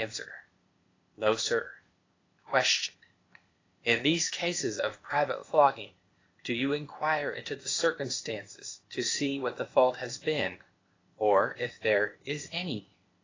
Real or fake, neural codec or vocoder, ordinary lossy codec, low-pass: fake; codec, 16 kHz, 0.8 kbps, ZipCodec; AAC, 32 kbps; 7.2 kHz